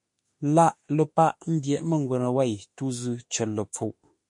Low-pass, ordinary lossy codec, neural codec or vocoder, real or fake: 10.8 kHz; MP3, 48 kbps; autoencoder, 48 kHz, 32 numbers a frame, DAC-VAE, trained on Japanese speech; fake